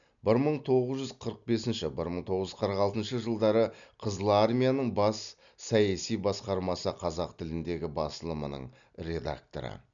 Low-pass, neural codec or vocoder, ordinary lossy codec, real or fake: 7.2 kHz; none; none; real